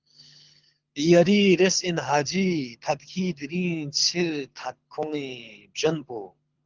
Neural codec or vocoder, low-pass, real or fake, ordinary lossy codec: codec, 24 kHz, 6 kbps, HILCodec; 7.2 kHz; fake; Opus, 24 kbps